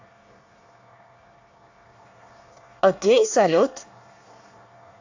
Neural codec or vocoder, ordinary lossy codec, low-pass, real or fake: codec, 24 kHz, 1 kbps, SNAC; AAC, 48 kbps; 7.2 kHz; fake